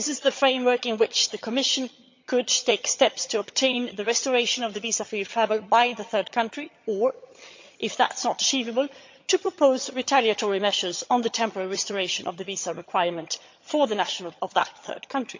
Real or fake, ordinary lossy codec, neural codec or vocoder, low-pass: fake; MP3, 64 kbps; vocoder, 22.05 kHz, 80 mel bands, HiFi-GAN; 7.2 kHz